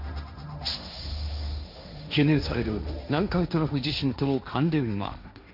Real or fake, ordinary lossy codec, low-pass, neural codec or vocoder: fake; none; 5.4 kHz; codec, 16 kHz, 1.1 kbps, Voila-Tokenizer